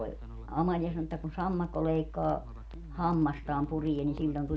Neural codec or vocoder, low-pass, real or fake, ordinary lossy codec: none; none; real; none